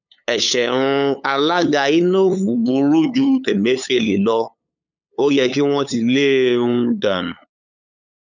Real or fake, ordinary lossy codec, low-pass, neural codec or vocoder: fake; none; 7.2 kHz; codec, 16 kHz, 8 kbps, FunCodec, trained on LibriTTS, 25 frames a second